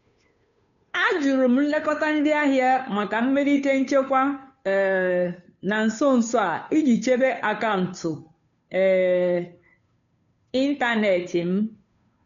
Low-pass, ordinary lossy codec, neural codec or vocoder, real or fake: 7.2 kHz; MP3, 96 kbps; codec, 16 kHz, 2 kbps, FunCodec, trained on Chinese and English, 25 frames a second; fake